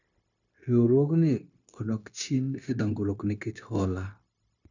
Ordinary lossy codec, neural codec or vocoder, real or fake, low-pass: none; codec, 16 kHz, 0.9 kbps, LongCat-Audio-Codec; fake; 7.2 kHz